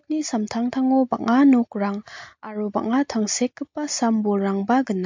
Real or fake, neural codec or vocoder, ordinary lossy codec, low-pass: real; none; MP3, 48 kbps; 7.2 kHz